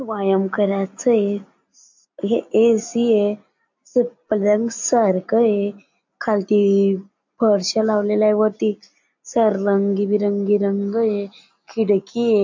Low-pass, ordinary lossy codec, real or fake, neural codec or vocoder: 7.2 kHz; MP3, 48 kbps; real; none